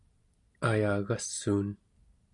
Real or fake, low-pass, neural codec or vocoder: fake; 10.8 kHz; vocoder, 44.1 kHz, 128 mel bands every 256 samples, BigVGAN v2